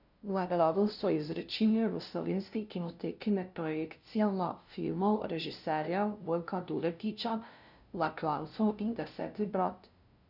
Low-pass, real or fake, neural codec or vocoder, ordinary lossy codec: 5.4 kHz; fake; codec, 16 kHz, 0.5 kbps, FunCodec, trained on LibriTTS, 25 frames a second; none